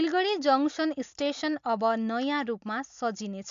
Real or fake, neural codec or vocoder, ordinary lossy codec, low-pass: real; none; AAC, 64 kbps; 7.2 kHz